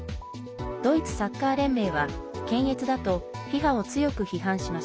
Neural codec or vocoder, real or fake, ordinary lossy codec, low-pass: none; real; none; none